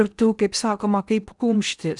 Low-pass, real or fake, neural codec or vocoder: 10.8 kHz; fake; codec, 16 kHz in and 24 kHz out, 0.8 kbps, FocalCodec, streaming, 65536 codes